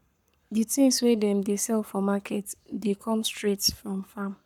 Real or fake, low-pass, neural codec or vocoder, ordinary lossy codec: fake; 19.8 kHz; codec, 44.1 kHz, 7.8 kbps, Pupu-Codec; none